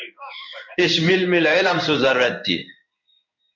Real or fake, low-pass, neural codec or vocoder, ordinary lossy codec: fake; 7.2 kHz; codec, 16 kHz in and 24 kHz out, 1 kbps, XY-Tokenizer; MP3, 48 kbps